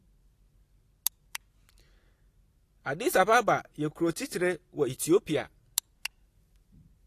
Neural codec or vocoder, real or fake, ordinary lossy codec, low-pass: none; real; AAC, 48 kbps; 14.4 kHz